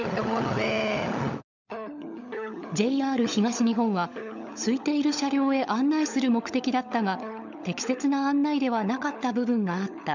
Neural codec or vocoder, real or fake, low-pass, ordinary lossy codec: codec, 16 kHz, 16 kbps, FunCodec, trained on LibriTTS, 50 frames a second; fake; 7.2 kHz; none